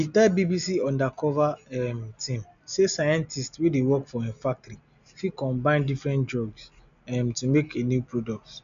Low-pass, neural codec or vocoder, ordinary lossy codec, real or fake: 7.2 kHz; none; none; real